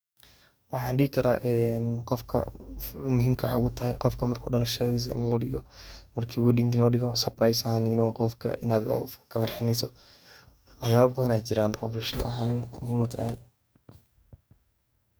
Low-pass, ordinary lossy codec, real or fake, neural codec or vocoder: none; none; fake; codec, 44.1 kHz, 2.6 kbps, DAC